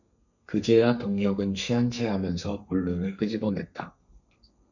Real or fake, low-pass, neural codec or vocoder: fake; 7.2 kHz; codec, 32 kHz, 1.9 kbps, SNAC